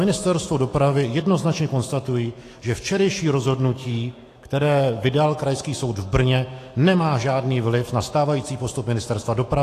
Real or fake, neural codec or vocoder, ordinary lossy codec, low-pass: fake; autoencoder, 48 kHz, 128 numbers a frame, DAC-VAE, trained on Japanese speech; AAC, 48 kbps; 14.4 kHz